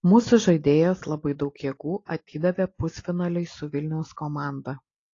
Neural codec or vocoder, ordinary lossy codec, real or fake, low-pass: none; AAC, 32 kbps; real; 7.2 kHz